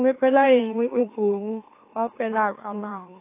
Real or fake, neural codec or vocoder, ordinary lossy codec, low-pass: fake; autoencoder, 44.1 kHz, a latent of 192 numbers a frame, MeloTTS; AAC, 24 kbps; 3.6 kHz